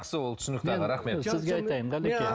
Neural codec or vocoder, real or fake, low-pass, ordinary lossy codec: none; real; none; none